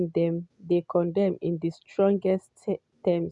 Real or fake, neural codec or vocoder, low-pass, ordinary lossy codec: real; none; 10.8 kHz; none